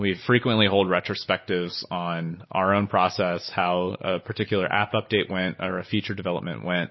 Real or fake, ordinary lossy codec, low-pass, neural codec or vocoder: real; MP3, 24 kbps; 7.2 kHz; none